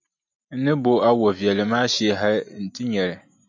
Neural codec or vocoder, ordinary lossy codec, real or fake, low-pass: none; MP3, 64 kbps; real; 7.2 kHz